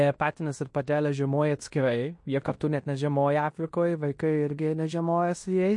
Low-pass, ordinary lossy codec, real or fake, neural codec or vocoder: 10.8 kHz; MP3, 48 kbps; fake; codec, 16 kHz in and 24 kHz out, 0.9 kbps, LongCat-Audio-Codec, fine tuned four codebook decoder